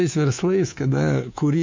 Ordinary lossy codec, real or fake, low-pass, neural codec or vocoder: MP3, 48 kbps; fake; 7.2 kHz; codec, 24 kHz, 3.1 kbps, DualCodec